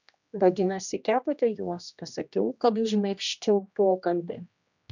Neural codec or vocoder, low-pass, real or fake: codec, 16 kHz, 1 kbps, X-Codec, HuBERT features, trained on general audio; 7.2 kHz; fake